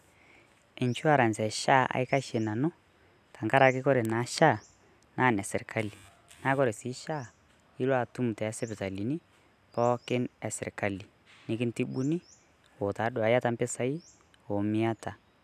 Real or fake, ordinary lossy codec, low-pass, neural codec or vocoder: real; none; 14.4 kHz; none